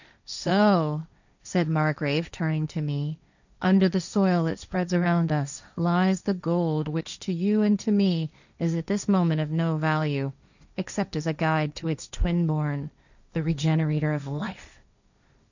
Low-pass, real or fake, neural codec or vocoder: 7.2 kHz; fake; codec, 16 kHz, 1.1 kbps, Voila-Tokenizer